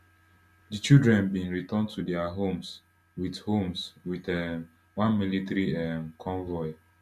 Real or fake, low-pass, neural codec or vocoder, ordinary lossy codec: real; 14.4 kHz; none; none